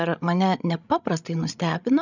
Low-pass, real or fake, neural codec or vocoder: 7.2 kHz; fake; codec, 16 kHz, 16 kbps, FreqCodec, larger model